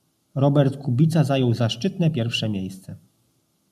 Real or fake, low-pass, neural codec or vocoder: real; 14.4 kHz; none